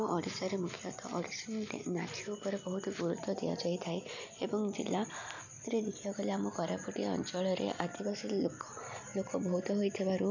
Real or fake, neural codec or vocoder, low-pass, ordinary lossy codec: real; none; 7.2 kHz; none